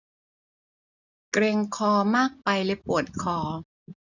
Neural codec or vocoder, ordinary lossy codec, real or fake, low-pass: none; none; real; 7.2 kHz